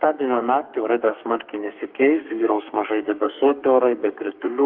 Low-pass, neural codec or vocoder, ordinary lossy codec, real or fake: 5.4 kHz; codec, 32 kHz, 1.9 kbps, SNAC; Opus, 32 kbps; fake